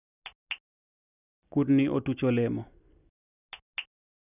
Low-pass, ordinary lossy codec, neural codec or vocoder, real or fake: 3.6 kHz; none; none; real